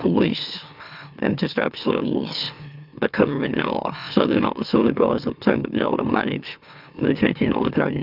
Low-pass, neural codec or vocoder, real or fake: 5.4 kHz; autoencoder, 44.1 kHz, a latent of 192 numbers a frame, MeloTTS; fake